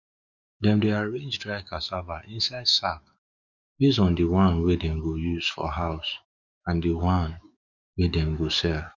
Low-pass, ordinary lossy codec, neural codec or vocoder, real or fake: 7.2 kHz; none; autoencoder, 48 kHz, 128 numbers a frame, DAC-VAE, trained on Japanese speech; fake